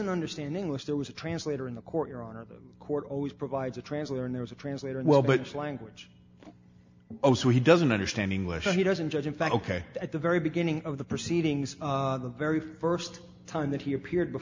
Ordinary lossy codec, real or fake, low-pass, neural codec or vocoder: MP3, 64 kbps; real; 7.2 kHz; none